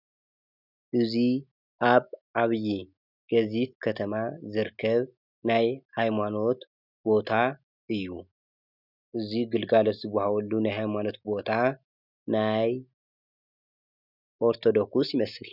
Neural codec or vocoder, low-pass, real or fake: none; 5.4 kHz; real